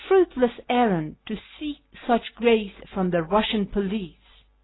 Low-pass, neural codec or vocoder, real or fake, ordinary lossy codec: 7.2 kHz; none; real; AAC, 16 kbps